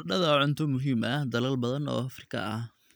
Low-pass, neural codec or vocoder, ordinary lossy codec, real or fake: none; none; none; real